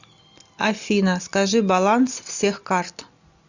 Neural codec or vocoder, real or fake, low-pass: none; real; 7.2 kHz